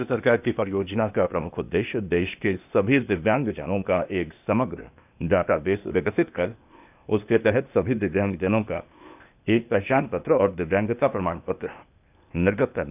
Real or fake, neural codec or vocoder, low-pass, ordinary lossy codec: fake; codec, 16 kHz, 0.8 kbps, ZipCodec; 3.6 kHz; none